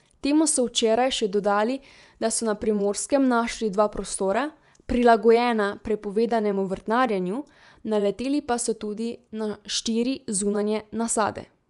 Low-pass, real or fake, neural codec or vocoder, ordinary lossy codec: 10.8 kHz; fake; vocoder, 24 kHz, 100 mel bands, Vocos; none